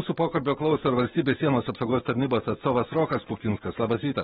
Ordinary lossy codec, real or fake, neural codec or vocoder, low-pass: AAC, 16 kbps; fake; autoencoder, 48 kHz, 128 numbers a frame, DAC-VAE, trained on Japanese speech; 19.8 kHz